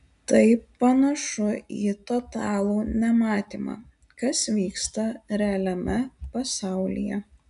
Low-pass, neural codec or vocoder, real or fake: 10.8 kHz; none; real